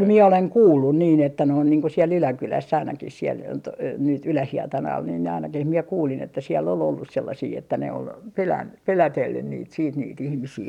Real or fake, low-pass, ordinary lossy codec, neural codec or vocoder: real; 19.8 kHz; none; none